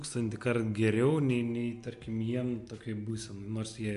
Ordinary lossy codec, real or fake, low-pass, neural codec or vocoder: MP3, 64 kbps; real; 10.8 kHz; none